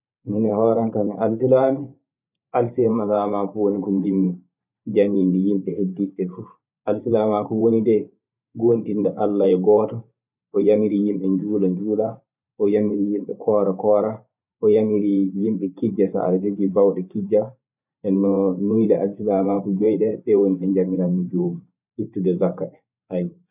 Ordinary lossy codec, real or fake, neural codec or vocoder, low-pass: none; fake; vocoder, 44.1 kHz, 128 mel bands every 512 samples, BigVGAN v2; 3.6 kHz